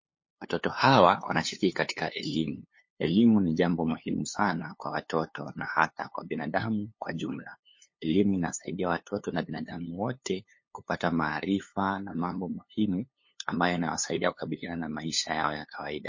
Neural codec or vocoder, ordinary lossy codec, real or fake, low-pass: codec, 16 kHz, 2 kbps, FunCodec, trained on LibriTTS, 25 frames a second; MP3, 32 kbps; fake; 7.2 kHz